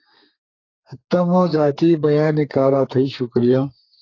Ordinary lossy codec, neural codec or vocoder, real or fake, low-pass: AAC, 32 kbps; codec, 32 kHz, 1.9 kbps, SNAC; fake; 7.2 kHz